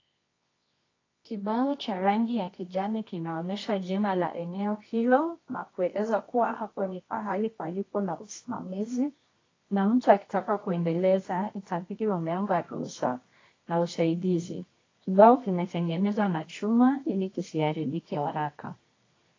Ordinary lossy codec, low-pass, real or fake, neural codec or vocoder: AAC, 32 kbps; 7.2 kHz; fake; codec, 24 kHz, 0.9 kbps, WavTokenizer, medium music audio release